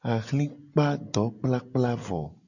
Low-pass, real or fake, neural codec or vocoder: 7.2 kHz; real; none